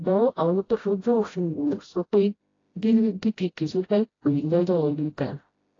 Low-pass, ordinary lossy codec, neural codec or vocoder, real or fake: 7.2 kHz; AAC, 32 kbps; codec, 16 kHz, 0.5 kbps, FreqCodec, smaller model; fake